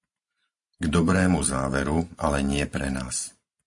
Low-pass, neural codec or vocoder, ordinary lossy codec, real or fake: 10.8 kHz; vocoder, 44.1 kHz, 128 mel bands every 512 samples, BigVGAN v2; MP3, 48 kbps; fake